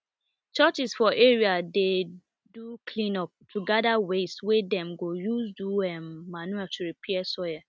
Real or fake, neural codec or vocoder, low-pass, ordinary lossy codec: real; none; none; none